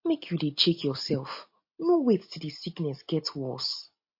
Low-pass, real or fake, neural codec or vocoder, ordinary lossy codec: 5.4 kHz; real; none; MP3, 32 kbps